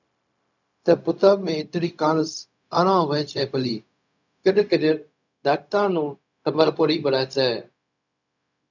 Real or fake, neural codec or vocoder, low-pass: fake; codec, 16 kHz, 0.4 kbps, LongCat-Audio-Codec; 7.2 kHz